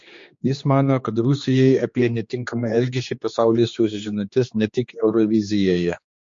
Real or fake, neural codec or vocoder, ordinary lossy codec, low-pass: fake; codec, 16 kHz, 2 kbps, X-Codec, HuBERT features, trained on general audio; MP3, 48 kbps; 7.2 kHz